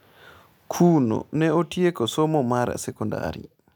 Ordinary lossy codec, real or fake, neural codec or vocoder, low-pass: none; real; none; none